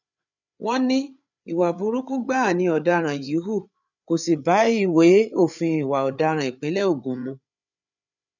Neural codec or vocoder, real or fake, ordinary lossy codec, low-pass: codec, 16 kHz, 8 kbps, FreqCodec, larger model; fake; none; 7.2 kHz